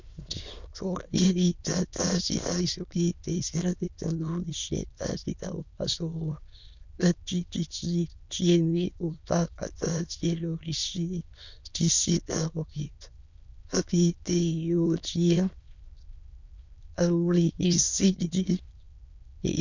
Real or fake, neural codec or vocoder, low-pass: fake; autoencoder, 22.05 kHz, a latent of 192 numbers a frame, VITS, trained on many speakers; 7.2 kHz